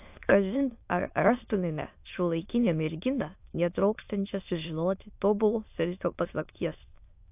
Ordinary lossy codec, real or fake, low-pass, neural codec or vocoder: AAC, 32 kbps; fake; 3.6 kHz; autoencoder, 22.05 kHz, a latent of 192 numbers a frame, VITS, trained on many speakers